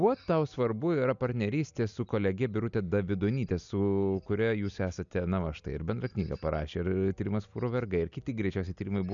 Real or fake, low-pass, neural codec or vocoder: real; 7.2 kHz; none